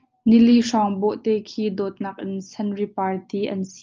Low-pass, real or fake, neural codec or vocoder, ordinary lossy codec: 7.2 kHz; real; none; Opus, 24 kbps